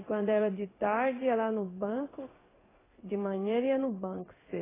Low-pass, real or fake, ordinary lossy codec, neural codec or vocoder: 3.6 kHz; fake; AAC, 16 kbps; codec, 16 kHz in and 24 kHz out, 1 kbps, XY-Tokenizer